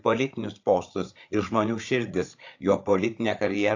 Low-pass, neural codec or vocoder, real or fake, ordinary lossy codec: 7.2 kHz; codec, 16 kHz, 8 kbps, FreqCodec, larger model; fake; AAC, 48 kbps